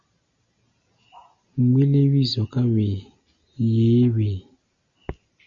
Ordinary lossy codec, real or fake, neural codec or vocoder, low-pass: Opus, 64 kbps; real; none; 7.2 kHz